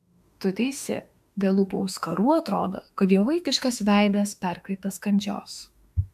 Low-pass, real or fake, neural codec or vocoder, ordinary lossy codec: 14.4 kHz; fake; autoencoder, 48 kHz, 32 numbers a frame, DAC-VAE, trained on Japanese speech; MP3, 96 kbps